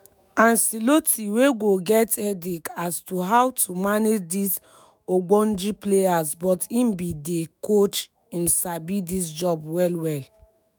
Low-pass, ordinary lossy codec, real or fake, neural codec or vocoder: none; none; fake; autoencoder, 48 kHz, 128 numbers a frame, DAC-VAE, trained on Japanese speech